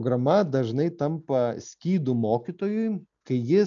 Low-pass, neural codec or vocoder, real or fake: 7.2 kHz; none; real